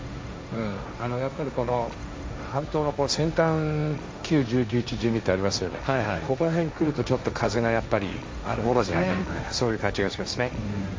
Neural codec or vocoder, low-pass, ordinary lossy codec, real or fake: codec, 16 kHz, 1.1 kbps, Voila-Tokenizer; none; none; fake